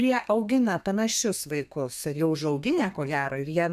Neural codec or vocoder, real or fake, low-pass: codec, 32 kHz, 1.9 kbps, SNAC; fake; 14.4 kHz